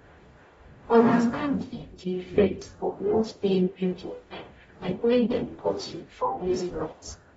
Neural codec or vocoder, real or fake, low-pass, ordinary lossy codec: codec, 44.1 kHz, 0.9 kbps, DAC; fake; 19.8 kHz; AAC, 24 kbps